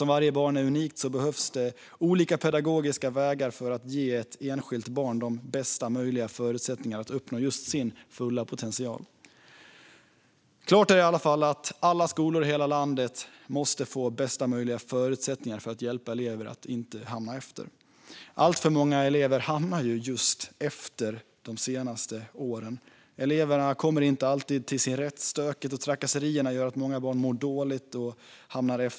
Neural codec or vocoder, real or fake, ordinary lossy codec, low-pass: none; real; none; none